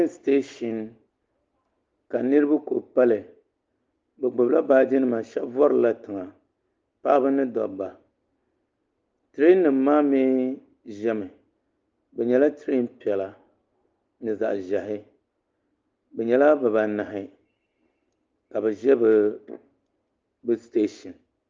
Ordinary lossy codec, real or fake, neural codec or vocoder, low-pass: Opus, 24 kbps; real; none; 7.2 kHz